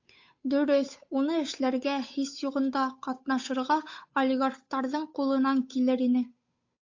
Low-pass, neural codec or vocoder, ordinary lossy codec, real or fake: 7.2 kHz; codec, 16 kHz, 8 kbps, FunCodec, trained on Chinese and English, 25 frames a second; AAC, 48 kbps; fake